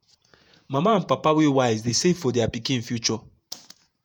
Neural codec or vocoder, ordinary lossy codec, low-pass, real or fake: vocoder, 48 kHz, 128 mel bands, Vocos; none; none; fake